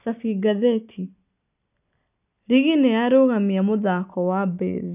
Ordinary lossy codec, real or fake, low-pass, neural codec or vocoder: none; real; 3.6 kHz; none